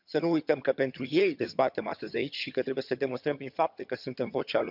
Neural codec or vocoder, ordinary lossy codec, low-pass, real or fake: vocoder, 22.05 kHz, 80 mel bands, HiFi-GAN; none; 5.4 kHz; fake